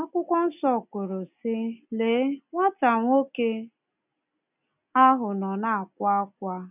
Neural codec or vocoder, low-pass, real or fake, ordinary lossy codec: none; 3.6 kHz; real; none